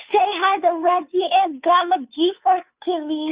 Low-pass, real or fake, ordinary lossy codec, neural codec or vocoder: 3.6 kHz; fake; Opus, 64 kbps; codec, 16 kHz, 2 kbps, FunCodec, trained on Chinese and English, 25 frames a second